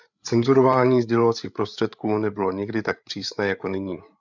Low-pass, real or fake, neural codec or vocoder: 7.2 kHz; fake; codec, 16 kHz, 8 kbps, FreqCodec, larger model